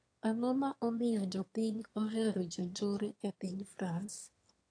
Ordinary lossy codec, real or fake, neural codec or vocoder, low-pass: AAC, 48 kbps; fake; autoencoder, 22.05 kHz, a latent of 192 numbers a frame, VITS, trained on one speaker; 9.9 kHz